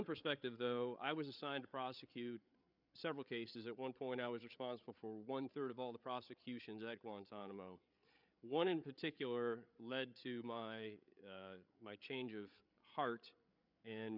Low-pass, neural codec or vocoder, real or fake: 5.4 kHz; codec, 16 kHz, 8 kbps, FreqCodec, larger model; fake